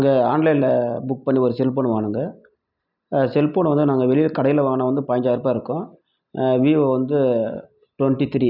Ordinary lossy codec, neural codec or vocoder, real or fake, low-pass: none; none; real; 5.4 kHz